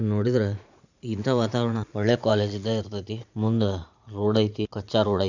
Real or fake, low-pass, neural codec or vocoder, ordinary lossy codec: fake; 7.2 kHz; vocoder, 44.1 kHz, 80 mel bands, Vocos; none